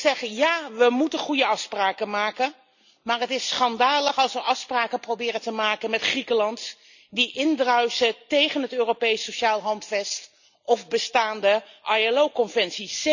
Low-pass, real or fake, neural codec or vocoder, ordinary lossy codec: 7.2 kHz; real; none; none